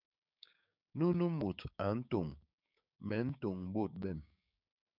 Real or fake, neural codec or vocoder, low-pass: fake; codec, 16 kHz, 6 kbps, DAC; 5.4 kHz